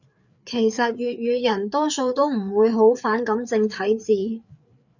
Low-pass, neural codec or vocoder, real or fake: 7.2 kHz; codec, 16 kHz, 4 kbps, FreqCodec, larger model; fake